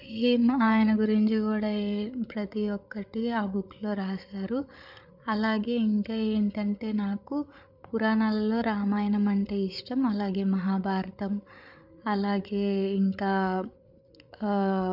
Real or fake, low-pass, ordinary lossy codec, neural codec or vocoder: fake; 5.4 kHz; none; codec, 16 kHz, 8 kbps, FreqCodec, larger model